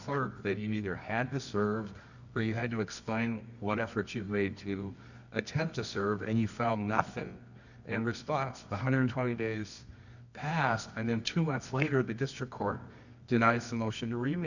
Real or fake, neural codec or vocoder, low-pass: fake; codec, 24 kHz, 0.9 kbps, WavTokenizer, medium music audio release; 7.2 kHz